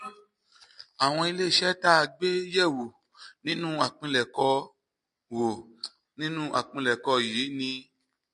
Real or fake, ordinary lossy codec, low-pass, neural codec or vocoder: real; MP3, 48 kbps; 10.8 kHz; none